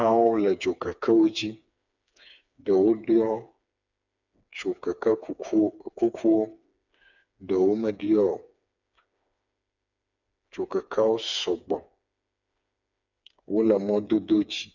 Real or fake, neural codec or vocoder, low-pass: fake; codec, 16 kHz, 4 kbps, FreqCodec, smaller model; 7.2 kHz